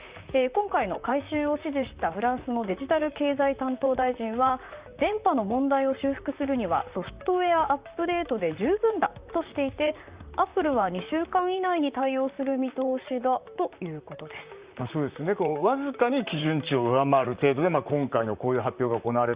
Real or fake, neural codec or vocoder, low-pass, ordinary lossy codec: fake; vocoder, 44.1 kHz, 128 mel bands, Pupu-Vocoder; 3.6 kHz; Opus, 64 kbps